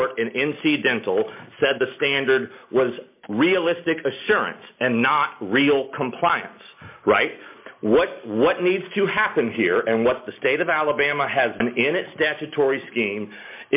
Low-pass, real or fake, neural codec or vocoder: 3.6 kHz; real; none